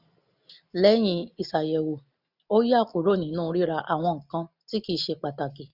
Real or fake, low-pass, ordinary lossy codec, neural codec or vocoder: real; 5.4 kHz; none; none